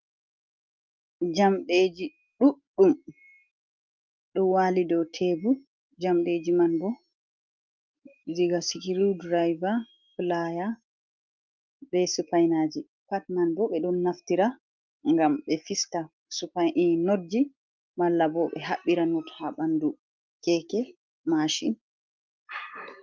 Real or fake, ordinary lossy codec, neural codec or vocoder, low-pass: real; Opus, 32 kbps; none; 7.2 kHz